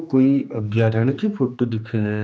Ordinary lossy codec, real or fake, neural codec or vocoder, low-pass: none; fake; codec, 16 kHz, 2 kbps, X-Codec, HuBERT features, trained on general audio; none